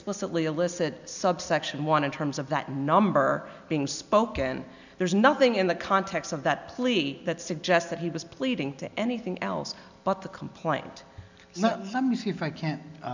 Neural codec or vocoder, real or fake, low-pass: none; real; 7.2 kHz